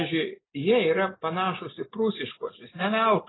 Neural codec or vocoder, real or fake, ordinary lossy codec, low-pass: none; real; AAC, 16 kbps; 7.2 kHz